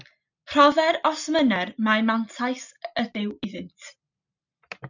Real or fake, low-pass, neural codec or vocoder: real; 7.2 kHz; none